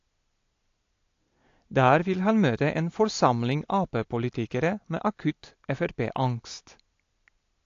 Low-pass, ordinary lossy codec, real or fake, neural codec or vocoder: 7.2 kHz; AAC, 48 kbps; real; none